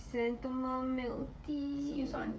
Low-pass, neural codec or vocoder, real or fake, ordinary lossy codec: none; codec, 16 kHz, 16 kbps, FreqCodec, smaller model; fake; none